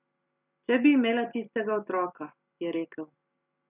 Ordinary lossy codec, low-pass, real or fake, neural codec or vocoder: none; 3.6 kHz; real; none